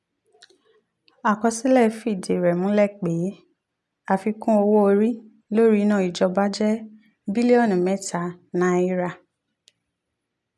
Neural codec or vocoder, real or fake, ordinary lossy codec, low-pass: none; real; none; none